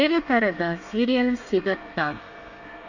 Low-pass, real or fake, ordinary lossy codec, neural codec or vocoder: 7.2 kHz; fake; none; codec, 24 kHz, 1 kbps, SNAC